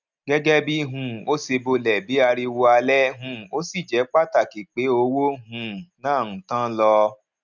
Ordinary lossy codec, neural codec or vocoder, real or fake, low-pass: none; none; real; 7.2 kHz